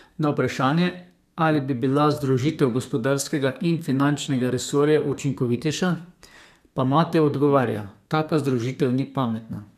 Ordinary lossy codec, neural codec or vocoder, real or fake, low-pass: none; codec, 32 kHz, 1.9 kbps, SNAC; fake; 14.4 kHz